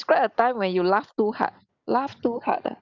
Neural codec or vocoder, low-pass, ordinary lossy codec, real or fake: none; 7.2 kHz; none; real